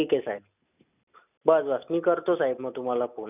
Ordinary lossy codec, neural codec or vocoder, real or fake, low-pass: none; none; real; 3.6 kHz